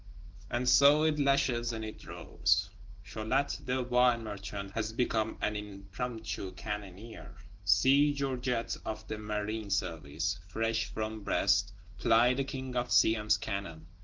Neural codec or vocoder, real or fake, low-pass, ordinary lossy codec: none; real; 7.2 kHz; Opus, 16 kbps